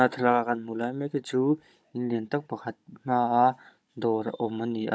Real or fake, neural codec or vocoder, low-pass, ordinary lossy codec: fake; codec, 16 kHz, 16 kbps, FunCodec, trained on Chinese and English, 50 frames a second; none; none